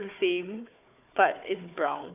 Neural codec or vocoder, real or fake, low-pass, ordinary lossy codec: codec, 16 kHz, 4 kbps, FunCodec, trained on Chinese and English, 50 frames a second; fake; 3.6 kHz; none